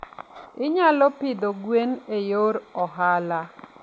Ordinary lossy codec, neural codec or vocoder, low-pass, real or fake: none; none; none; real